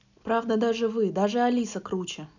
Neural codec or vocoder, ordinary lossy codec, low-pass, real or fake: none; none; 7.2 kHz; real